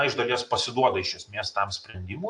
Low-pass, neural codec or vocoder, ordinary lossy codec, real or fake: 10.8 kHz; none; AAC, 64 kbps; real